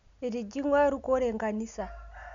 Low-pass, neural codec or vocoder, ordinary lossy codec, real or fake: 7.2 kHz; none; MP3, 64 kbps; real